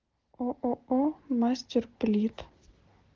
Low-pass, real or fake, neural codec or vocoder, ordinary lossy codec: 7.2 kHz; real; none; Opus, 16 kbps